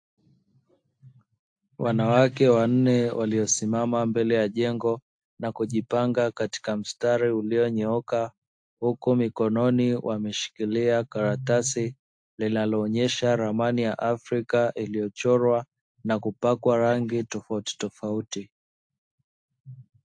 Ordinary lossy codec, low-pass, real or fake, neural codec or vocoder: AAC, 64 kbps; 9.9 kHz; real; none